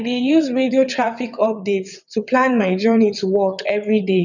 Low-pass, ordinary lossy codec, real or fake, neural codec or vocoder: 7.2 kHz; none; fake; vocoder, 22.05 kHz, 80 mel bands, WaveNeXt